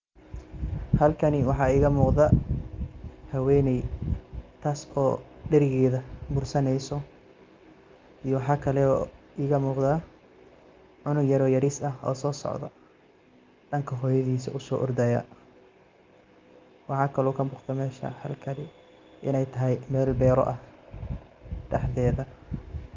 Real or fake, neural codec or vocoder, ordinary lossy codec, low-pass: real; none; Opus, 32 kbps; 7.2 kHz